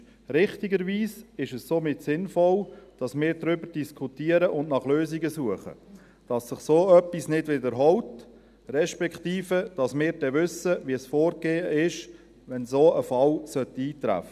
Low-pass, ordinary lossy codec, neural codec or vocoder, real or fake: 14.4 kHz; none; none; real